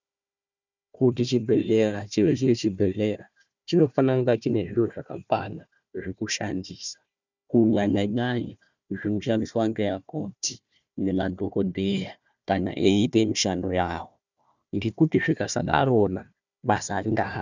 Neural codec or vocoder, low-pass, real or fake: codec, 16 kHz, 1 kbps, FunCodec, trained on Chinese and English, 50 frames a second; 7.2 kHz; fake